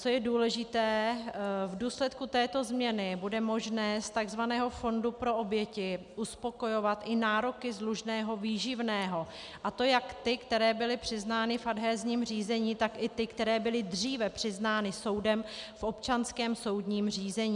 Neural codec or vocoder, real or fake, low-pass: none; real; 10.8 kHz